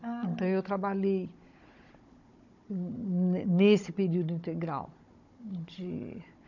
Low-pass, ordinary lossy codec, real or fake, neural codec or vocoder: 7.2 kHz; none; fake; codec, 16 kHz, 16 kbps, FunCodec, trained on Chinese and English, 50 frames a second